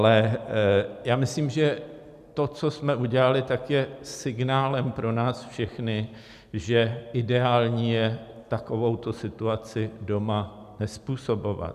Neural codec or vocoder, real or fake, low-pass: none; real; 14.4 kHz